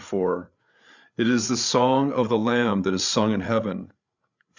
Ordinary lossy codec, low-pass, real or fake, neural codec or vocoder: Opus, 64 kbps; 7.2 kHz; fake; codec, 16 kHz, 8 kbps, FreqCodec, larger model